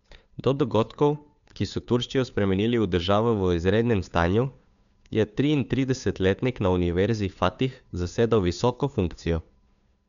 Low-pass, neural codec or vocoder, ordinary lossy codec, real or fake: 7.2 kHz; codec, 16 kHz, 2 kbps, FunCodec, trained on Chinese and English, 25 frames a second; none; fake